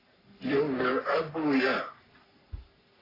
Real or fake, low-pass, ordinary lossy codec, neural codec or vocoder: fake; 5.4 kHz; AAC, 24 kbps; codec, 44.1 kHz, 3.4 kbps, Pupu-Codec